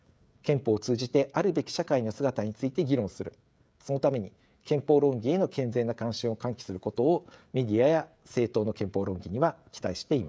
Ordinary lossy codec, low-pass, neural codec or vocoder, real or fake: none; none; codec, 16 kHz, 16 kbps, FreqCodec, smaller model; fake